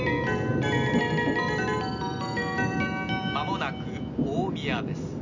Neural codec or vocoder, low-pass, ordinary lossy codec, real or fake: none; 7.2 kHz; none; real